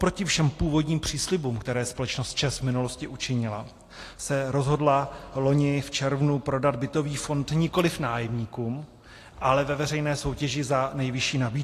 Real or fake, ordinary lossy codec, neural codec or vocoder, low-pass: real; AAC, 48 kbps; none; 14.4 kHz